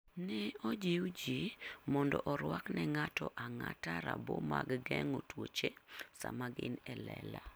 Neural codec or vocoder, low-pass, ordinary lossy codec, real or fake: none; none; none; real